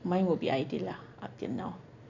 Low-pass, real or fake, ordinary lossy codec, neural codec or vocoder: 7.2 kHz; real; none; none